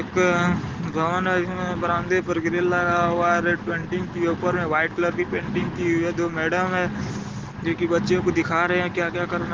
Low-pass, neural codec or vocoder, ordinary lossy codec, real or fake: 7.2 kHz; none; Opus, 16 kbps; real